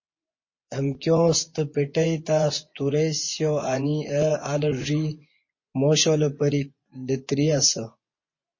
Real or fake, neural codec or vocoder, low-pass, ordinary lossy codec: fake; vocoder, 24 kHz, 100 mel bands, Vocos; 7.2 kHz; MP3, 32 kbps